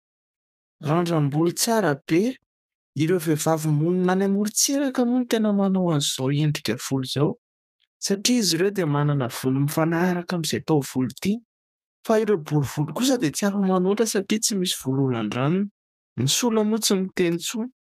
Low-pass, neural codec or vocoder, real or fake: 14.4 kHz; codec, 32 kHz, 1.9 kbps, SNAC; fake